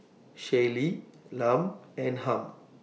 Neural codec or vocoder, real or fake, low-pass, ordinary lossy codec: none; real; none; none